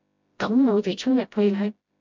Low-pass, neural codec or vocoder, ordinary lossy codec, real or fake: 7.2 kHz; codec, 16 kHz, 0.5 kbps, FreqCodec, smaller model; MP3, 64 kbps; fake